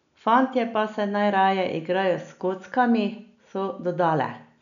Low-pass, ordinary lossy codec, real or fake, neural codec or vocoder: 7.2 kHz; none; real; none